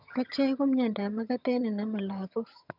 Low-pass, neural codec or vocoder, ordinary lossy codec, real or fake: 5.4 kHz; vocoder, 22.05 kHz, 80 mel bands, HiFi-GAN; none; fake